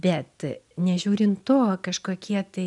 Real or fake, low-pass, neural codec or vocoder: real; 10.8 kHz; none